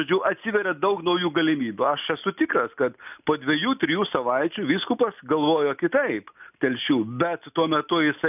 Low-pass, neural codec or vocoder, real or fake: 3.6 kHz; none; real